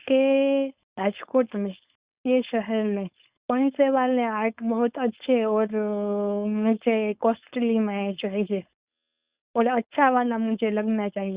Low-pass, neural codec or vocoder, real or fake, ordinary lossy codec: 3.6 kHz; codec, 16 kHz, 4.8 kbps, FACodec; fake; Opus, 64 kbps